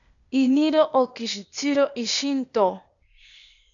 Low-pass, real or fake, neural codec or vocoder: 7.2 kHz; fake; codec, 16 kHz, 0.8 kbps, ZipCodec